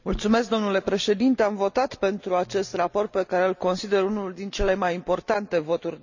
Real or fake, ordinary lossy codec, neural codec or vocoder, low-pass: real; none; none; 7.2 kHz